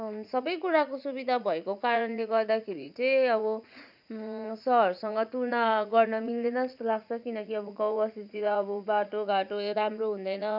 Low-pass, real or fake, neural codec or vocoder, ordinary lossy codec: 5.4 kHz; fake; vocoder, 44.1 kHz, 80 mel bands, Vocos; none